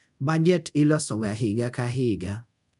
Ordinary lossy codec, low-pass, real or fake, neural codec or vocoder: none; 10.8 kHz; fake; codec, 24 kHz, 0.5 kbps, DualCodec